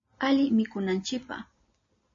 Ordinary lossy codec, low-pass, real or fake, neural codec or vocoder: MP3, 32 kbps; 7.2 kHz; real; none